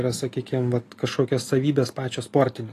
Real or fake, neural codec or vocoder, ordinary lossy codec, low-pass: real; none; AAC, 48 kbps; 14.4 kHz